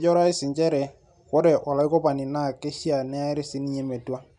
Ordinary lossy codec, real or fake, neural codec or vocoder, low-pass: none; real; none; 10.8 kHz